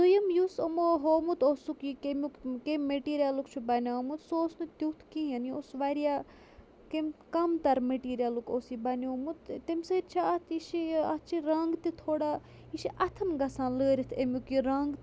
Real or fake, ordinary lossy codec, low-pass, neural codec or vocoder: real; none; none; none